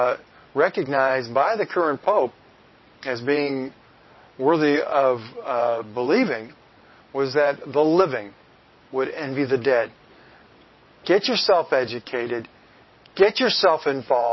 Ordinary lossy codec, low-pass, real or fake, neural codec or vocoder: MP3, 24 kbps; 7.2 kHz; fake; vocoder, 22.05 kHz, 80 mel bands, WaveNeXt